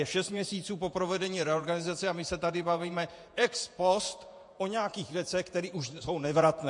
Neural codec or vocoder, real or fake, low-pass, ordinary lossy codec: vocoder, 48 kHz, 128 mel bands, Vocos; fake; 10.8 kHz; MP3, 48 kbps